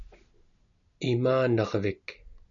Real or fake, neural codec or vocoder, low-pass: real; none; 7.2 kHz